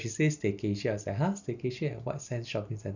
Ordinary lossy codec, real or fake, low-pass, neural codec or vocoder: none; real; 7.2 kHz; none